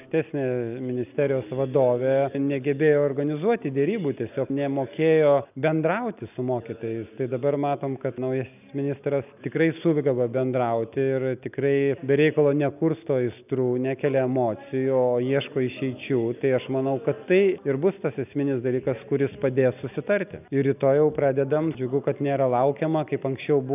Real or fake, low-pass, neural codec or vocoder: real; 3.6 kHz; none